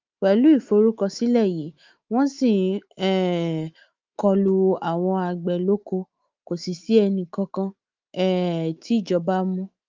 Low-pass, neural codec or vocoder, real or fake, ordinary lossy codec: 7.2 kHz; autoencoder, 48 kHz, 128 numbers a frame, DAC-VAE, trained on Japanese speech; fake; Opus, 32 kbps